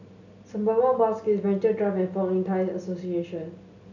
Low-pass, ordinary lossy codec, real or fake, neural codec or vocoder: 7.2 kHz; none; real; none